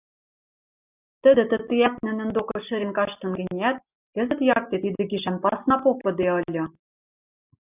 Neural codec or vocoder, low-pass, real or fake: none; 3.6 kHz; real